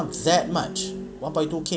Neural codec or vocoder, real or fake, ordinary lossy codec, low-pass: none; real; none; none